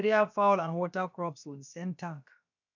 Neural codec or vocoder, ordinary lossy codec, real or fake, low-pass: codec, 16 kHz, about 1 kbps, DyCAST, with the encoder's durations; none; fake; 7.2 kHz